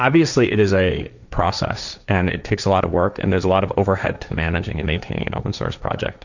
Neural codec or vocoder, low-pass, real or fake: codec, 16 kHz, 1.1 kbps, Voila-Tokenizer; 7.2 kHz; fake